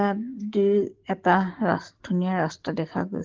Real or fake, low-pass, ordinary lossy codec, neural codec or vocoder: fake; 7.2 kHz; Opus, 16 kbps; codec, 44.1 kHz, 7.8 kbps, DAC